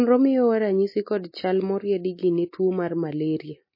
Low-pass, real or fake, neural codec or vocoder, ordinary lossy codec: 5.4 kHz; real; none; MP3, 24 kbps